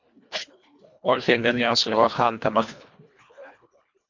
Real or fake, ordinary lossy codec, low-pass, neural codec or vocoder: fake; MP3, 48 kbps; 7.2 kHz; codec, 24 kHz, 1.5 kbps, HILCodec